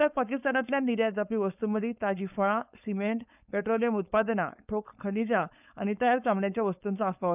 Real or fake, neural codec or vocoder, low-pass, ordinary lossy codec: fake; codec, 16 kHz, 4.8 kbps, FACodec; 3.6 kHz; none